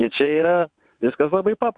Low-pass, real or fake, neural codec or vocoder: 9.9 kHz; fake; vocoder, 22.05 kHz, 80 mel bands, WaveNeXt